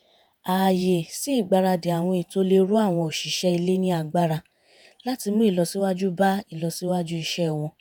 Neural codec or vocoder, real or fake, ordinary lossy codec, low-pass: vocoder, 48 kHz, 128 mel bands, Vocos; fake; none; none